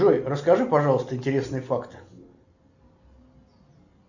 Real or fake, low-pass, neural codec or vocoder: real; 7.2 kHz; none